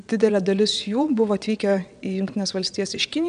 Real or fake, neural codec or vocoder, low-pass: fake; vocoder, 22.05 kHz, 80 mel bands, WaveNeXt; 9.9 kHz